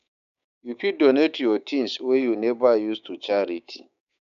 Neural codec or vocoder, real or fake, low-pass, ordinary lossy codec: codec, 16 kHz, 6 kbps, DAC; fake; 7.2 kHz; none